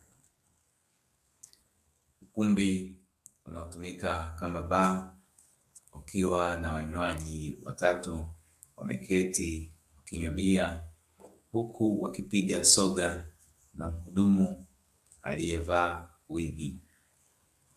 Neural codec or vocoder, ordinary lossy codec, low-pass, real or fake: codec, 32 kHz, 1.9 kbps, SNAC; AAC, 96 kbps; 14.4 kHz; fake